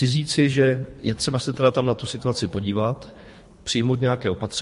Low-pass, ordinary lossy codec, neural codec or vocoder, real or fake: 10.8 kHz; MP3, 48 kbps; codec, 24 kHz, 3 kbps, HILCodec; fake